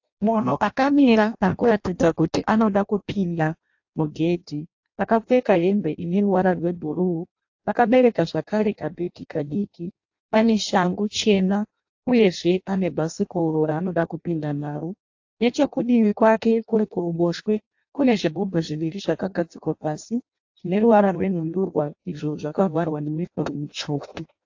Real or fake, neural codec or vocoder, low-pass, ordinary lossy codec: fake; codec, 16 kHz in and 24 kHz out, 0.6 kbps, FireRedTTS-2 codec; 7.2 kHz; AAC, 48 kbps